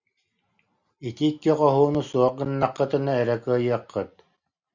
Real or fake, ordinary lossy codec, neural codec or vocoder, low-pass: real; Opus, 64 kbps; none; 7.2 kHz